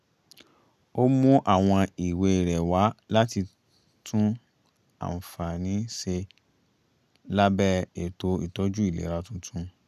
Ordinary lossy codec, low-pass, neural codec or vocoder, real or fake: none; 14.4 kHz; none; real